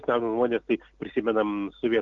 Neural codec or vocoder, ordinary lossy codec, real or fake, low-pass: none; Opus, 32 kbps; real; 7.2 kHz